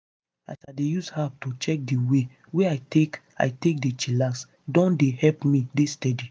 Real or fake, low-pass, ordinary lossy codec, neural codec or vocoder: real; none; none; none